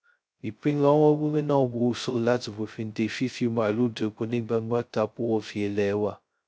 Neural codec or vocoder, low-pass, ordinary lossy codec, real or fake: codec, 16 kHz, 0.2 kbps, FocalCodec; none; none; fake